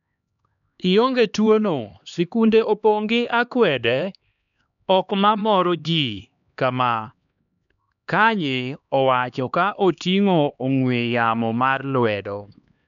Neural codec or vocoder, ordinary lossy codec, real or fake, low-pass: codec, 16 kHz, 2 kbps, X-Codec, HuBERT features, trained on LibriSpeech; none; fake; 7.2 kHz